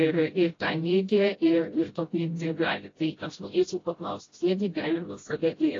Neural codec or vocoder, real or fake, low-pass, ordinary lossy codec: codec, 16 kHz, 0.5 kbps, FreqCodec, smaller model; fake; 7.2 kHz; AAC, 32 kbps